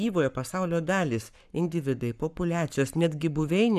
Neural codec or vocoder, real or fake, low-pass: codec, 44.1 kHz, 7.8 kbps, Pupu-Codec; fake; 14.4 kHz